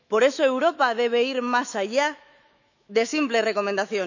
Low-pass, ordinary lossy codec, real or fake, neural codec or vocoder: 7.2 kHz; none; fake; autoencoder, 48 kHz, 128 numbers a frame, DAC-VAE, trained on Japanese speech